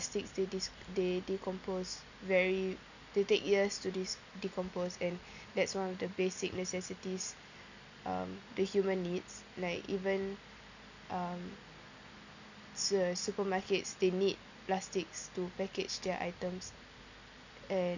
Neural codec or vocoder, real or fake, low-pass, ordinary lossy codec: none; real; 7.2 kHz; none